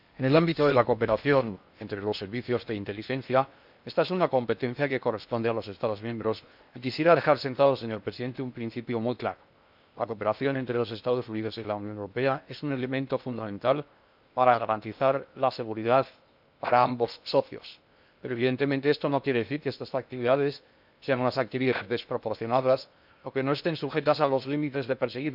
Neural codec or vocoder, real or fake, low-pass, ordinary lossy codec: codec, 16 kHz in and 24 kHz out, 0.8 kbps, FocalCodec, streaming, 65536 codes; fake; 5.4 kHz; none